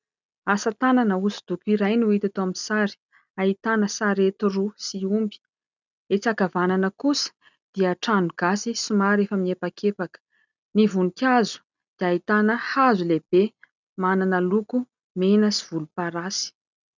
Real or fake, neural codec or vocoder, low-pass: real; none; 7.2 kHz